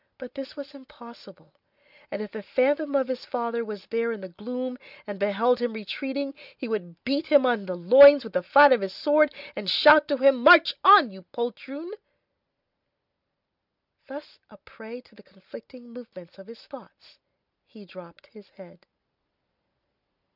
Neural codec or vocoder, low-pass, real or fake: none; 5.4 kHz; real